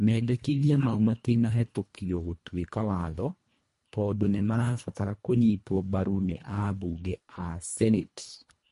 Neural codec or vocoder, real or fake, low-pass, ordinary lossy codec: codec, 24 kHz, 1.5 kbps, HILCodec; fake; 10.8 kHz; MP3, 48 kbps